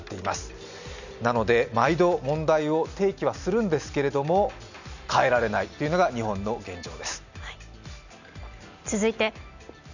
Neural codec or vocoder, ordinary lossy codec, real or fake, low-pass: none; none; real; 7.2 kHz